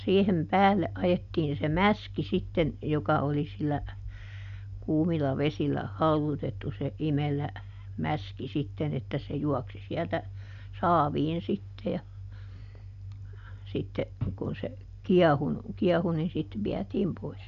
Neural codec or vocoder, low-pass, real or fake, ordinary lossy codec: none; 7.2 kHz; real; none